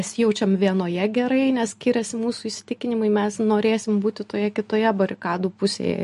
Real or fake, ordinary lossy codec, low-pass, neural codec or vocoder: real; MP3, 48 kbps; 14.4 kHz; none